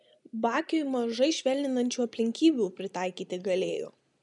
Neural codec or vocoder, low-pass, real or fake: none; 10.8 kHz; real